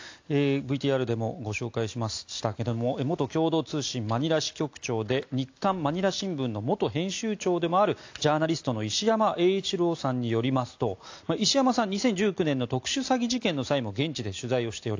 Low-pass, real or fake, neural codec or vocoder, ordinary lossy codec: 7.2 kHz; real; none; AAC, 48 kbps